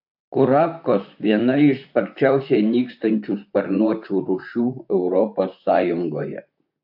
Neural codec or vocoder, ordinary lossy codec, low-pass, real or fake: vocoder, 44.1 kHz, 128 mel bands, Pupu-Vocoder; AAC, 48 kbps; 5.4 kHz; fake